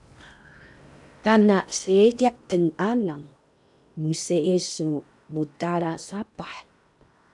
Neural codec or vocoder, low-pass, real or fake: codec, 16 kHz in and 24 kHz out, 0.8 kbps, FocalCodec, streaming, 65536 codes; 10.8 kHz; fake